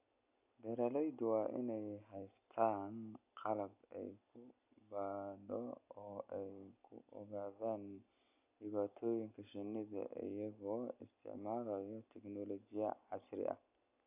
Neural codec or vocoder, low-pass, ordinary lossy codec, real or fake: none; 3.6 kHz; none; real